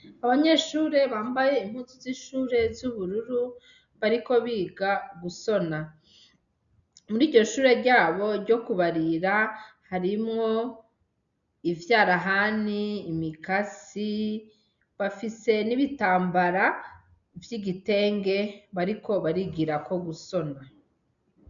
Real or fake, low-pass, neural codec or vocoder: real; 7.2 kHz; none